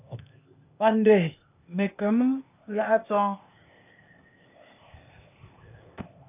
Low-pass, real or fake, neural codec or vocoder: 3.6 kHz; fake; codec, 16 kHz, 0.8 kbps, ZipCodec